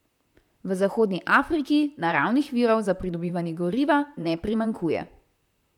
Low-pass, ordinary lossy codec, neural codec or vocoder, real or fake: 19.8 kHz; none; vocoder, 44.1 kHz, 128 mel bands, Pupu-Vocoder; fake